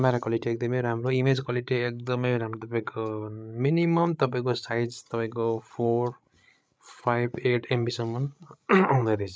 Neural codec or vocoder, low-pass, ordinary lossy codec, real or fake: codec, 16 kHz, 16 kbps, FreqCodec, larger model; none; none; fake